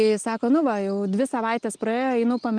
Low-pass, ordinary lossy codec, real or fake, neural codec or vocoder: 9.9 kHz; Opus, 32 kbps; real; none